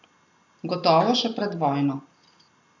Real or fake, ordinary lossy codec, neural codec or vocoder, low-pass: real; AAC, 48 kbps; none; 7.2 kHz